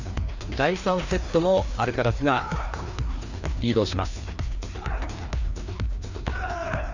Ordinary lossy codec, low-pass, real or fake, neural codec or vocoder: none; 7.2 kHz; fake; codec, 16 kHz, 2 kbps, FreqCodec, larger model